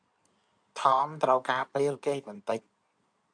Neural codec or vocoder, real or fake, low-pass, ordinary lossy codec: codec, 16 kHz in and 24 kHz out, 2.2 kbps, FireRedTTS-2 codec; fake; 9.9 kHz; MP3, 96 kbps